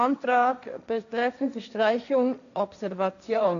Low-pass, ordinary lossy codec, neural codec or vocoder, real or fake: 7.2 kHz; none; codec, 16 kHz, 1.1 kbps, Voila-Tokenizer; fake